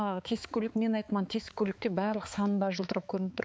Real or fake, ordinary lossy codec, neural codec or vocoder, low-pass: fake; none; codec, 16 kHz, 4 kbps, X-Codec, HuBERT features, trained on balanced general audio; none